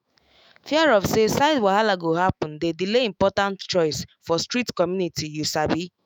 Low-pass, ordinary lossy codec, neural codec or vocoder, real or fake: none; none; autoencoder, 48 kHz, 128 numbers a frame, DAC-VAE, trained on Japanese speech; fake